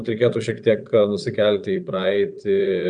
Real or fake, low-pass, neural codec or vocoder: fake; 9.9 kHz; vocoder, 22.05 kHz, 80 mel bands, Vocos